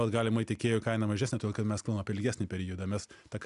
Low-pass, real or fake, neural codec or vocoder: 10.8 kHz; real; none